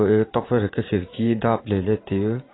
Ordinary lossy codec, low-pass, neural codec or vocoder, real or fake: AAC, 16 kbps; 7.2 kHz; vocoder, 44.1 kHz, 80 mel bands, Vocos; fake